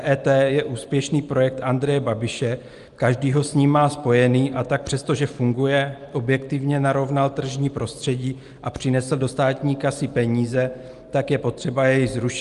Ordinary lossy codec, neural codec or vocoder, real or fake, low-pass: Opus, 32 kbps; none; real; 10.8 kHz